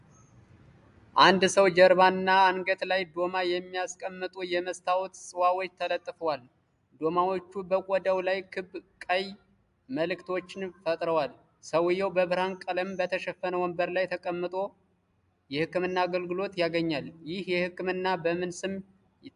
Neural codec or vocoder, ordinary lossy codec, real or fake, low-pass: none; AAC, 96 kbps; real; 10.8 kHz